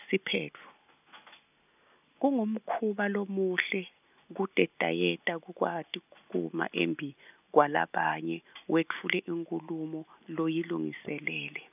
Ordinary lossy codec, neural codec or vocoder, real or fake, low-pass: none; none; real; 3.6 kHz